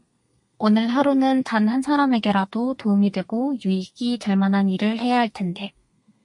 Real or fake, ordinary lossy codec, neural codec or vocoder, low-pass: fake; MP3, 48 kbps; codec, 32 kHz, 1.9 kbps, SNAC; 10.8 kHz